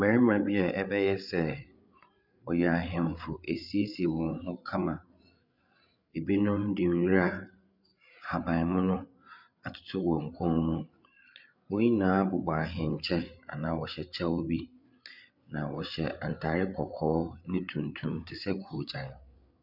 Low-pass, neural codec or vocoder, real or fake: 5.4 kHz; codec, 16 kHz, 8 kbps, FreqCodec, larger model; fake